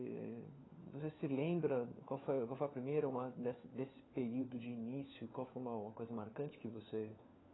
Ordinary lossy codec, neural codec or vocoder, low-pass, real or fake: AAC, 16 kbps; codec, 16 kHz, 4 kbps, FunCodec, trained on LibriTTS, 50 frames a second; 7.2 kHz; fake